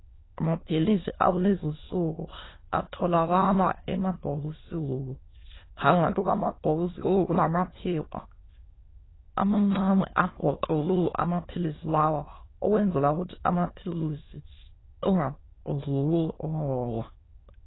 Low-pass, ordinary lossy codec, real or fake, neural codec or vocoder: 7.2 kHz; AAC, 16 kbps; fake; autoencoder, 22.05 kHz, a latent of 192 numbers a frame, VITS, trained on many speakers